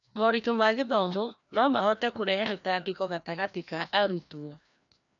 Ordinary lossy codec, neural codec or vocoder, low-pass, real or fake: none; codec, 16 kHz, 1 kbps, FreqCodec, larger model; 7.2 kHz; fake